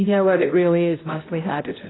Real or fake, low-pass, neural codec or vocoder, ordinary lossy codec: fake; 7.2 kHz; codec, 16 kHz, 0.5 kbps, X-Codec, HuBERT features, trained on balanced general audio; AAC, 16 kbps